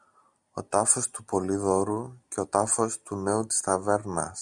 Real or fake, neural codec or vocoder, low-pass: real; none; 10.8 kHz